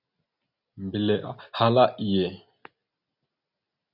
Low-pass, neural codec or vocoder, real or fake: 5.4 kHz; none; real